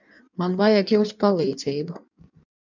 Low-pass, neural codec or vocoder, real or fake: 7.2 kHz; codec, 16 kHz in and 24 kHz out, 1.1 kbps, FireRedTTS-2 codec; fake